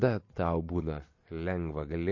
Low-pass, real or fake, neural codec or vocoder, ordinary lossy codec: 7.2 kHz; real; none; MP3, 32 kbps